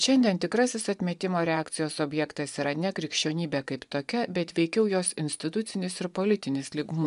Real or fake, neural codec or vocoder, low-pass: fake; vocoder, 24 kHz, 100 mel bands, Vocos; 10.8 kHz